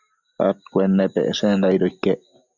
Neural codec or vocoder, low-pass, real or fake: none; 7.2 kHz; real